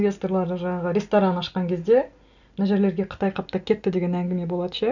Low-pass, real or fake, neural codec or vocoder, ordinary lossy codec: 7.2 kHz; real; none; none